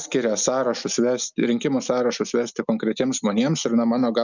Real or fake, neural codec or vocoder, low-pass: real; none; 7.2 kHz